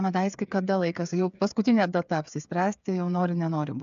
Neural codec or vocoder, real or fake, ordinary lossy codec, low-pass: codec, 16 kHz, 8 kbps, FreqCodec, smaller model; fake; MP3, 96 kbps; 7.2 kHz